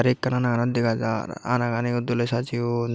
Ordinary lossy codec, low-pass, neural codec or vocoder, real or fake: none; none; none; real